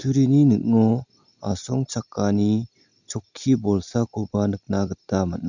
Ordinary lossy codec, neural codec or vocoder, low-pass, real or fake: none; none; 7.2 kHz; real